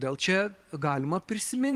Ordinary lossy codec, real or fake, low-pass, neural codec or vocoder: Opus, 24 kbps; fake; 14.4 kHz; vocoder, 44.1 kHz, 128 mel bands every 512 samples, BigVGAN v2